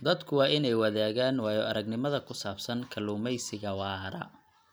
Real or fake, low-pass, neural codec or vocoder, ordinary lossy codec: real; none; none; none